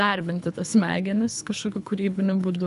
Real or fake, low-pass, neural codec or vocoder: fake; 10.8 kHz; codec, 24 kHz, 3 kbps, HILCodec